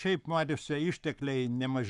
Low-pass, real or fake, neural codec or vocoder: 10.8 kHz; fake; codec, 44.1 kHz, 7.8 kbps, Pupu-Codec